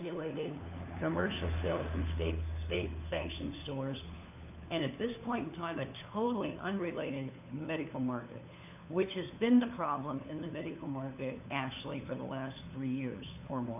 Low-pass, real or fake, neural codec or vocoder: 3.6 kHz; fake; codec, 16 kHz, 4 kbps, FunCodec, trained on LibriTTS, 50 frames a second